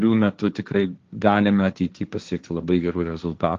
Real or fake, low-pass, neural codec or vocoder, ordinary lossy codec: fake; 7.2 kHz; codec, 16 kHz, 1.1 kbps, Voila-Tokenizer; Opus, 32 kbps